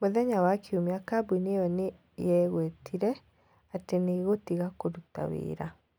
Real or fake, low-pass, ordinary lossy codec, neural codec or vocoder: real; none; none; none